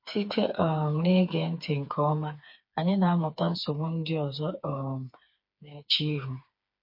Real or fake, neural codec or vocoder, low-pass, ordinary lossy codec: fake; codec, 16 kHz, 4 kbps, FreqCodec, smaller model; 5.4 kHz; MP3, 32 kbps